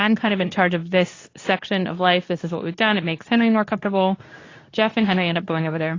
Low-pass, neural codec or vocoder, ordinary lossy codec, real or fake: 7.2 kHz; codec, 24 kHz, 0.9 kbps, WavTokenizer, medium speech release version 2; AAC, 32 kbps; fake